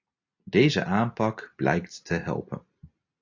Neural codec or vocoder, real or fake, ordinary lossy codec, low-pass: none; real; AAC, 48 kbps; 7.2 kHz